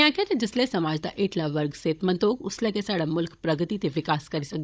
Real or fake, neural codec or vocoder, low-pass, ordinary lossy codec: fake; codec, 16 kHz, 16 kbps, FunCodec, trained on Chinese and English, 50 frames a second; none; none